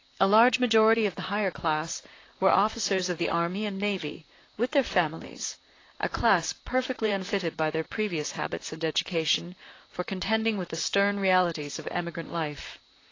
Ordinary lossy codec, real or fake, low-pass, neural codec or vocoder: AAC, 32 kbps; fake; 7.2 kHz; vocoder, 44.1 kHz, 128 mel bands, Pupu-Vocoder